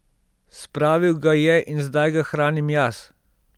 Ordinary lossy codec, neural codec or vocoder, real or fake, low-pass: Opus, 32 kbps; none; real; 19.8 kHz